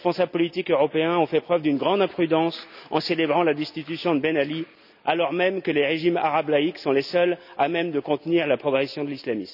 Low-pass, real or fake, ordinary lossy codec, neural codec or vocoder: 5.4 kHz; real; none; none